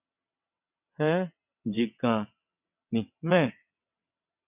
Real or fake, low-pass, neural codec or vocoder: fake; 3.6 kHz; vocoder, 22.05 kHz, 80 mel bands, WaveNeXt